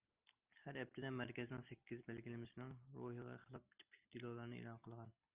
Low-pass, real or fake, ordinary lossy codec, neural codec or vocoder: 3.6 kHz; real; Opus, 24 kbps; none